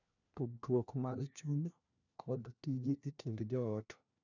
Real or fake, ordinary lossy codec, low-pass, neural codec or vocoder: fake; none; 7.2 kHz; codec, 16 kHz, 1 kbps, FunCodec, trained on LibriTTS, 50 frames a second